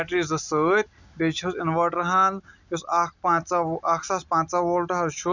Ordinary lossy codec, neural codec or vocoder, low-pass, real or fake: none; none; 7.2 kHz; real